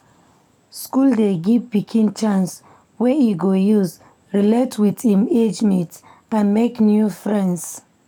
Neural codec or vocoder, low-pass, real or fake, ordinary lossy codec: codec, 44.1 kHz, 7.8 kbps, DAC; 19.8 kHz; fake; none